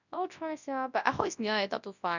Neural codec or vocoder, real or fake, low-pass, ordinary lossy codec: codec, 24 kHz, 0.9 kbps, WavTokenizer, large speech release; fake; 7.2 kHz; none